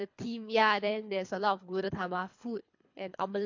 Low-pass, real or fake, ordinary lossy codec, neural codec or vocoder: 7.2 kHz; fake; MP3, 48 kbps; codec, 24 kHz, 3 kbps, HILCodec